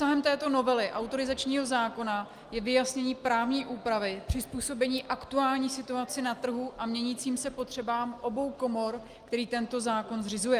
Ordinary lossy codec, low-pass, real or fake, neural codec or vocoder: Opus, 32 kbps; 14.4 kHz; real; none